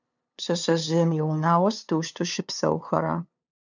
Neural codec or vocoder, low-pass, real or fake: codec, 16 kHz, 2 kbps, FunCodec, trained on LibriTTS, 25 frames a second; 7.2 kHz; fake